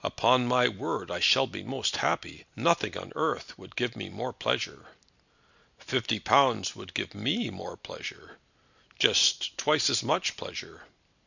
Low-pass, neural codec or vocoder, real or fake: 7.2 kHz; none; real